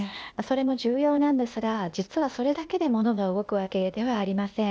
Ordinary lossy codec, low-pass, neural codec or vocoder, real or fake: none; none; codec, 16 kHz, 0.8 kbps, ZipCodec; fake